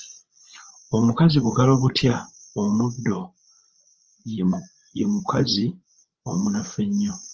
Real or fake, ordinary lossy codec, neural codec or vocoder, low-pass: fake; Opus, 24 kbps; codec, 16 kHz, 8 kbps, FreqCodec, larger model; 7.2 kHz